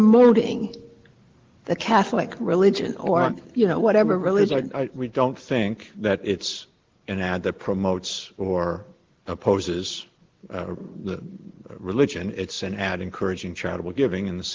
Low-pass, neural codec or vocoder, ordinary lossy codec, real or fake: 7.2 kHz; none; Opus, 16 kbps; real